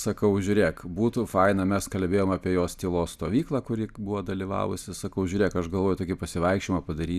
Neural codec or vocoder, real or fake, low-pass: none; real; 14.4 kHz